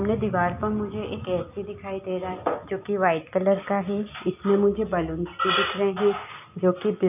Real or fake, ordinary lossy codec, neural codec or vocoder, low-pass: real; none; none; 3.6 kHz